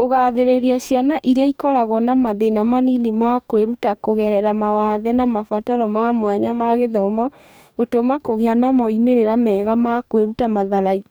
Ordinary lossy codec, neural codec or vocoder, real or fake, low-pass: none; codec, 44.1 kHz, 2.6 kbps, DAC; fake; none